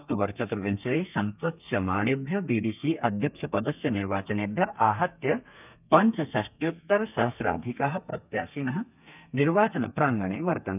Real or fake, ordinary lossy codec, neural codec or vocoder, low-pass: fake; none; codec, 32 kHz, 1.9 kbps, SNAC; 3.6 kHz